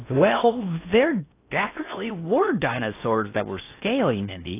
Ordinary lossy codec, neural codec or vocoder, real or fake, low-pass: AAC, 24 kbps; codec, 16 kHz in and 24 kHz out, 0.6 kbps, FocalCodec, streaming, 4096 codes; fake; 3.6 kHz